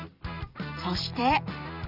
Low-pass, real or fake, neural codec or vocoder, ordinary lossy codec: 5.4 kHz; fake; vocoder, 44.1 kHz, 128 mel bands every 256 samples, BigVGAN v2; none